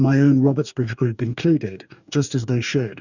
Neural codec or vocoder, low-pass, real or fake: codec, 44.1 kHz, 2.6 kbps, DAC; 7.2 kHz; fake